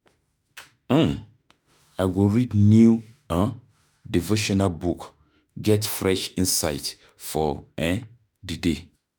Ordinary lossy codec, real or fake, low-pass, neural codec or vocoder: none; fake; none; autoencoder, 48 kHz, 32 numbers a frame, DAC-VAE, trained on Japanese speech